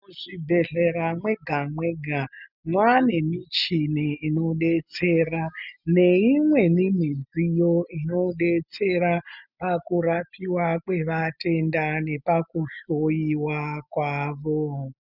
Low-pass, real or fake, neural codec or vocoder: 5.4 kHz; real; none